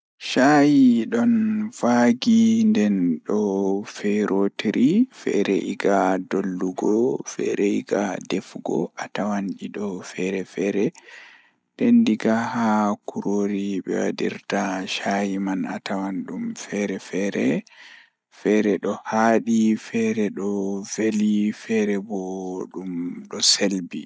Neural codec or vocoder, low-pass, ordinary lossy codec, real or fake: none; none; none; real